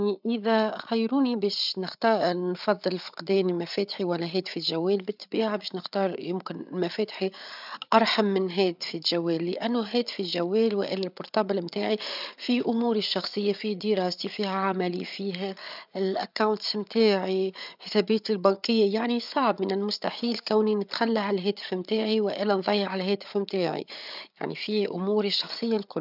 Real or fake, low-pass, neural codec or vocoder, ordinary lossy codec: fake; 5.4 kHz; codec, 16 kHz, 8 kbps, FreqCodec, larger model; none